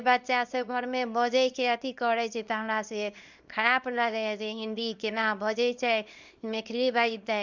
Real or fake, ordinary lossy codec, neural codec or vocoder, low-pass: fake; Opus, 64 kbps; codec, 24 kHz, 0.9 kbps, WavTokenizer, small release; 7.2 kHz